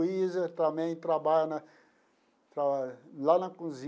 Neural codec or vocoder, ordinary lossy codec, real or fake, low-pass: none; none; real; none